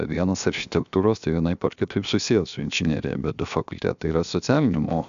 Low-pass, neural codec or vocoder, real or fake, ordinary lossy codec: 7.2 kHz; codec, 16 kHz, 0.7 kbps, FocalCodec; fake; AAC, 96 kbps